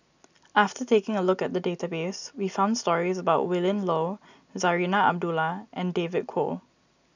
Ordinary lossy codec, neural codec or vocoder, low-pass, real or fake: none; none; 7.2 kHz; real